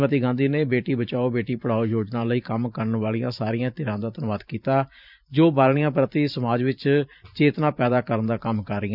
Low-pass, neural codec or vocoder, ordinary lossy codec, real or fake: 5.4 kHz; vocoder, 44.1 kHz, 128 mel bands every 512 samples, BigVGAN v2; none; fake